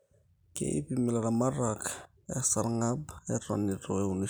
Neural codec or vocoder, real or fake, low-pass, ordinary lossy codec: none; real; none; none